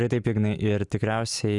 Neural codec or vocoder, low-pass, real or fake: none; 10.8 kHz; real